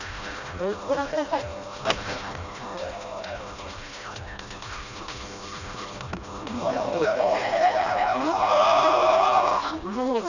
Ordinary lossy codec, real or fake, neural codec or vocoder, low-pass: none; fake; codec, 16 kHz, 1 kbps, FreqCodec, smaller model; 7.2 kHz